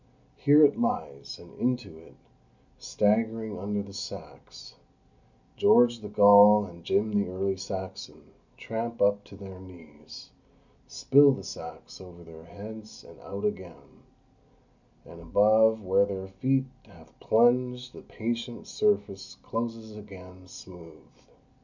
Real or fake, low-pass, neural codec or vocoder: real; 7.2 kHz; none